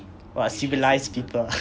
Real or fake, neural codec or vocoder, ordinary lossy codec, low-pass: real; none; none; none